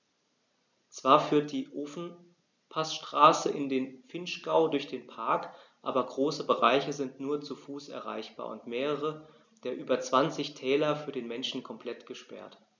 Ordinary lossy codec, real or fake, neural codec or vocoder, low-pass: none; real; none; 7.2 kHz